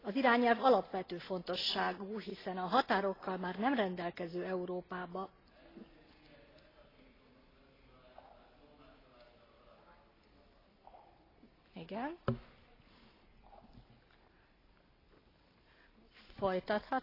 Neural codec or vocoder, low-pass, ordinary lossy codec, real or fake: none; 5.4 kHz; AAC, 24 kbps; real